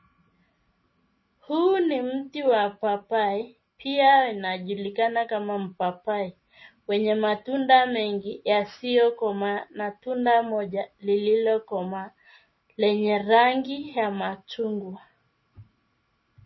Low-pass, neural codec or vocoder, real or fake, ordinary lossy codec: 7.2 kHz; none; real; MP3, 24 kbps